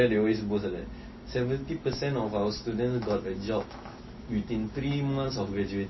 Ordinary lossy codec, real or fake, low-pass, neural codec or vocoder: MP3, 24 kbps; real; 7.2 kHz; none